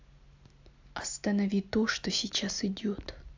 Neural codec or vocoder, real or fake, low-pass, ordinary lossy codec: none; real; 7.2 kHz; AAC, 48 kbps